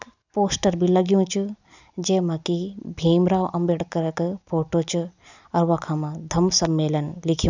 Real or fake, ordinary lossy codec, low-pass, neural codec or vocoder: real; none; 7.2 kHz; none